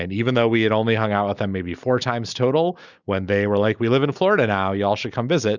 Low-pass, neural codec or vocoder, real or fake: 7.2 kHz; none; real